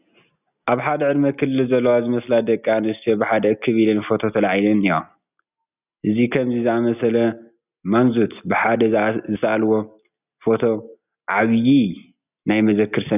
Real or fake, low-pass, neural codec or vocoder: real; 3.6 kHz; none